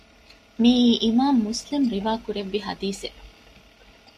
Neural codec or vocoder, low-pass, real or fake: none; 14.4 kHz; real